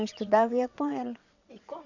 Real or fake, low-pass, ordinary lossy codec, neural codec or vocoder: fake; 7.2 kHz; none; vocoder, 22.05 kHz, 80 mel bands, WaveNeXt